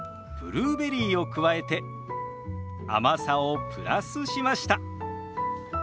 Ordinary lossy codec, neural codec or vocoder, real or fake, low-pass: none; none; real; none